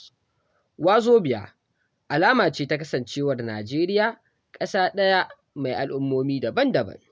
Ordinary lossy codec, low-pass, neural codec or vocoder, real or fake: none; none; none; real